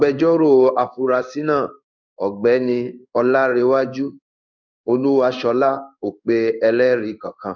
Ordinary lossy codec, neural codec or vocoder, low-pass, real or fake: none; codec, 16 kHz in and 24 kHz out, 1 kbps, XY-Tokenizer; 7.2 kHz; fake